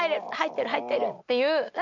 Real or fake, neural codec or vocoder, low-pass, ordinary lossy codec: real; none; 7.2 kHz; none